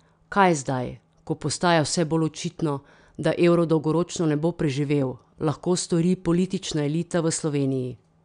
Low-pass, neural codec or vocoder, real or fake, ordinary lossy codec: 9.9 kHz; none; real; none